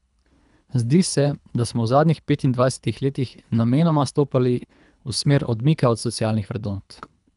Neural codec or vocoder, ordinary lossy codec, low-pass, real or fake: codec, 24 kHz, 3 kbps, HILCodec; none; 10.8 kHz; fake